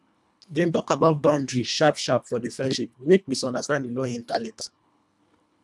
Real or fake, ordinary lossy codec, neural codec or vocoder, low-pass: fake; none; codec, 24 kHz, 1.5 kbps, HILCodec; none